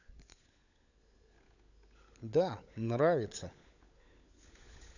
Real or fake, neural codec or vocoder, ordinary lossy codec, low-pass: fake; codec, 16 kHz, 16 kbps, FunCodec, trained on LibriTTS, 50 frames a second; none; 7.2 kHz